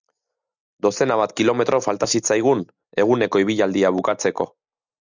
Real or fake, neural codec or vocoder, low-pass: real; none; 7.2 kHz